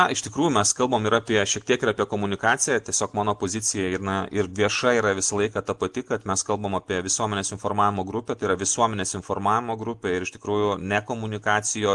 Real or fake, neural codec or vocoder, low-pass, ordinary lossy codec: real; none; 10.8 kHz; Opus, 24 kbps